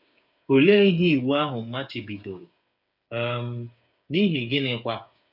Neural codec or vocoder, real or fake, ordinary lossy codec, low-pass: codec, 16 kHz, 8 kbps, FreqCodec, smaller model; fake; none; 5.4 kHz